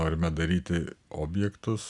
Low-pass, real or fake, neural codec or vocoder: 10.8 kHz; real; none